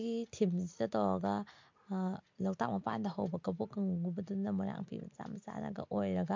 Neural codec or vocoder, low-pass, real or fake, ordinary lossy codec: none; 7.2 kHz; real; MP3, 48 kbps